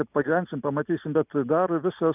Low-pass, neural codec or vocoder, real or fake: 3.6 kHz; none; real